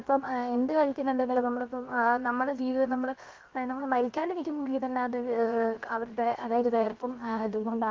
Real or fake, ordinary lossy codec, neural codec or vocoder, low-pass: fake; Opus, 32 kbps; codec, 16 kHz, 0.7 kbps, FocalCodec; 7.2 kHz